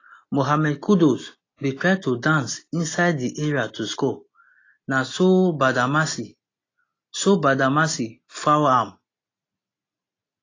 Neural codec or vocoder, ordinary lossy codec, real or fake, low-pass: none; AAC, 32 kbps; real; 7.2 kHz